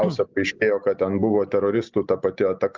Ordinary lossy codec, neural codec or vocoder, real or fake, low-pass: Opus, 32 kbps; none; real; 7.2 kHz